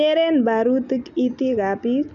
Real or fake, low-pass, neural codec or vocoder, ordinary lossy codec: real; 7.2 kHz; none; none